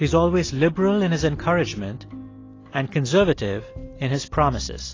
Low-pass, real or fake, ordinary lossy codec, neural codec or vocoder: 7.2 kHz; real; AAC, 32 kbps; none